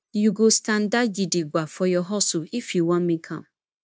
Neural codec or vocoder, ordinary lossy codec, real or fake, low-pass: codec, 16 kHz, 0.9 kbps, LongCat-Audio-Codec; none; fake; none